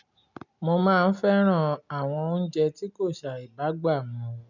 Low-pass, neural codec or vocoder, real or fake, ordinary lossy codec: 7.2 kHz; none; real; none